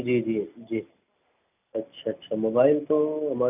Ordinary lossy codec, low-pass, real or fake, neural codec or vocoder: none; 3.6 kHz; real; none